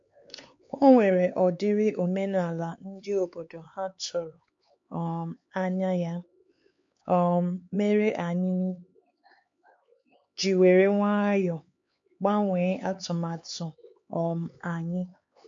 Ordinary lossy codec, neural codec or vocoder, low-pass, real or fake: MP3, 48 kbps; codec, 16 kHz, 4 kbps, X-Codec, HuBERT features, trained on LibriSpeech; 7.2 kHz; fake